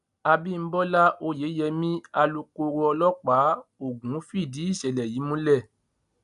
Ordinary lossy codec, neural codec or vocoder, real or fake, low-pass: none; none; real; 10.8 kHz